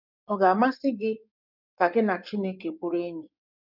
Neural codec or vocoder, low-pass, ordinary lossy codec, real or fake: codec, 16 kHz in and 24 kHz out, 2.2 kbps, FireRedTTS-2 codec; 5.4 kHz; none; fake